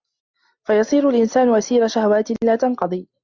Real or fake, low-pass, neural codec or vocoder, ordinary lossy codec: real; 7.2 kHz; none; Opus, 64 kbps